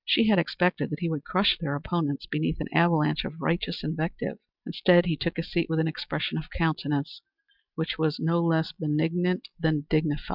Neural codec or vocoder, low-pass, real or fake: none; 5.4 kHz; real